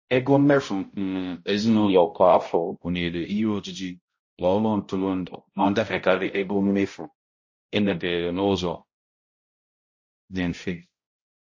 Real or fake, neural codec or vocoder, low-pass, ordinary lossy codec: fake; codec, 16 kHz, 0.5 kbps, X-Codec, HuBERT features, trained on balanced general audio; 7.2 kHz; MP3, 32 kbps